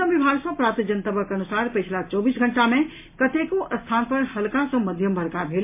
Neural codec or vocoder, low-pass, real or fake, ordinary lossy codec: none; 3.6 kHz; real; MP3, 24 kbps